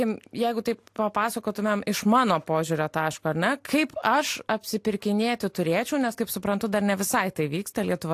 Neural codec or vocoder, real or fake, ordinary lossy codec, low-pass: none; real; AAC, 64 kbps; 14.4 kHz